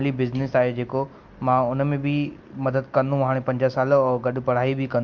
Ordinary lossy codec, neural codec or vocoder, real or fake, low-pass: Opus, 32 kbps; none; real; 7.2 kHz